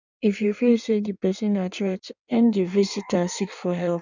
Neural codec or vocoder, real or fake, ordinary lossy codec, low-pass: codec, 16 kHz in and 24 kHz out, 1.1 kbps, FireRedTTS-2 codec; fake; none; 7.2 kHz